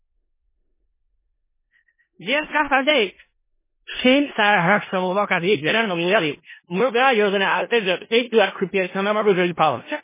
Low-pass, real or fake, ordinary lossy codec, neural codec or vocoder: 3.6 kHz; fake; MP3, 16 kbps; codec, 16 kHz in and 24 kHz out, 0.4 kbps, LongCat-Audio-Codec, four codebook decoder